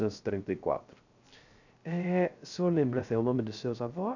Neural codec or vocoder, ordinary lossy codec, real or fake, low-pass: codec, 16 kHz, 0.3 kbps, FocalCodec; none; fake; 7.2 kHz